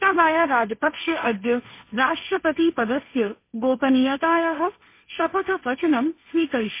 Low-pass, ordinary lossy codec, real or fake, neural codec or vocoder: 3.6 kHz; MP3, 24 kbps; fake; codec, 16 kHz, 1.1 kbps, Voila-Tokenizer